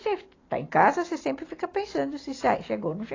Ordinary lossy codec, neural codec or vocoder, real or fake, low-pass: AAC, 32 kbps; none; real; 7.2 kHz